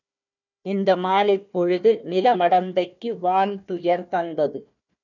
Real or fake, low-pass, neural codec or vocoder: fake; 7.2 kHz; codec, 16 kHz, 1 kbps, FunCodec, trained on Chinese and English, 50 frames a second